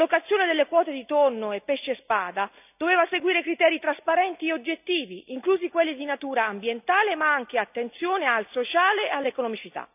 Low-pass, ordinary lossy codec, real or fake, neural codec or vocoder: 3.6 kHz; none; real; none